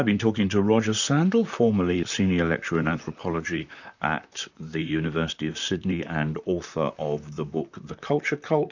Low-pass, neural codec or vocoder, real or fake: 7.2 kHz; vocoder, 44.1 kHz, 128 mel bands, Pupu-Vocoder; fake